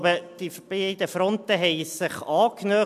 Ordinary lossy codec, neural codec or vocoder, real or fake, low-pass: AAC, 96 kbps; none; real; 14.4 kHz